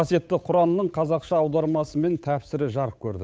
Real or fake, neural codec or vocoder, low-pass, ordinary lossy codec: fake; codec, 16 kHz, 8 kbps, FunCodec, trained on Chinese and English, 25 frames a second; none; none